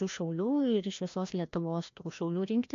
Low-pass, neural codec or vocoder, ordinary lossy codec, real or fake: 7.2 kHz; codec, 16 kHz, 1 kbps, FreqCodec, larger model; AAC, 48 kbps; fake